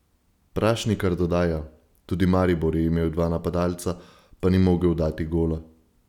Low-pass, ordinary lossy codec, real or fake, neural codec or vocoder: 19.8 kHz; none; real; none